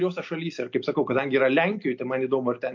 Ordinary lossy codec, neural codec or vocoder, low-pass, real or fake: MP3, 48 kbps; none; 7.2 kHz; real